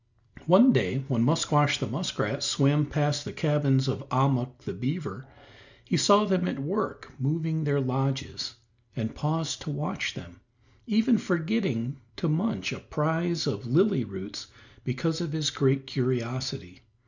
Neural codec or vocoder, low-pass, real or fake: none; 7.2 kHz; real